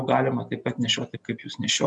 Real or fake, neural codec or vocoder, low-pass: real; none; 10.8 kHz